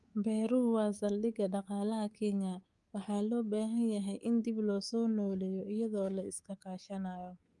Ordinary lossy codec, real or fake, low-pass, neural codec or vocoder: Opus, 32 kbps; fake; 10.8 kHz; codec, 24 kHz, 3.1 kbps, DualCodec